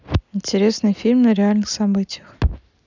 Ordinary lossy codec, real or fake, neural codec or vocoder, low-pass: none; real; none; 7.2 kHz